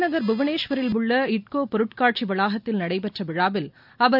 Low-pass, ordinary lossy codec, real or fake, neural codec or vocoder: 5.4 kHz; none; real; none